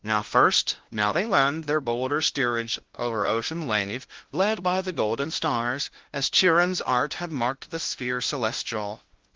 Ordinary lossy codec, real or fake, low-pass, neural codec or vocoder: Opus, 16 kbps; fake; 7.2 kHz; codec, 16 kHz, 0.5 kbps, FunCodec, trained on LibriTTS, 25 frames a second